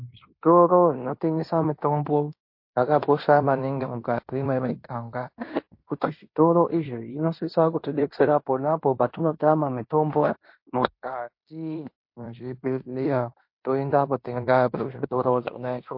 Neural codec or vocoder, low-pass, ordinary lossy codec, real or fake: codec, 16 kHz in and 24 kHz out, 0.9 kbps, LongCat-Audio-Codec, fine tuned four codebook decoder; 5.4 kHz; MP3, 32 kbps; fake